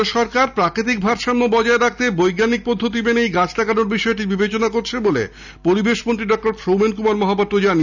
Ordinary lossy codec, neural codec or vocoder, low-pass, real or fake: none; none; 7.2 kHz; real